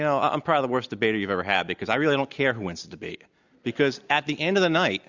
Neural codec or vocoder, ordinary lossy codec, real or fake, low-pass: none; Opus, 64 kbps; real; 7.2 kHz